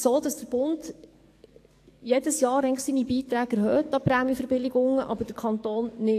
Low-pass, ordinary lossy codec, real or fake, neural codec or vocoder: 14.4 kHz; AAC, 64 kbps; fake; codec, 44.1 kHz, 7.8 kbps, DAC